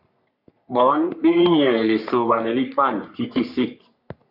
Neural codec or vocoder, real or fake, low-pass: codec, 44.1 kHz, 3.4 kbps, Pupu-Codec; fake; 5.4 kHz